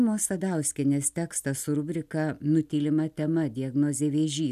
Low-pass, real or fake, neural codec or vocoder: 14.4 kHz; real; none